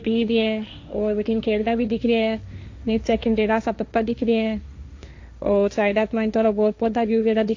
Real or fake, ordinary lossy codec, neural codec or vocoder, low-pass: fake; AAC, 48 kbps; codec, 16 kHz, 1.1 kbps, Voila-Tokenizer; 7.2 kHz